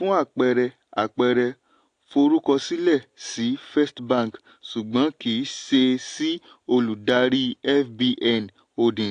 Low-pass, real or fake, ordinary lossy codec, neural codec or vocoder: 9.9 kHz; real; AAC, 48 kbps; none